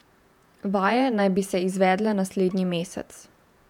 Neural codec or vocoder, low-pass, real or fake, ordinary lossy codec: vocoder, 48 kHz, 128 mel bands, Vocos; 19.8 kHz; fake; none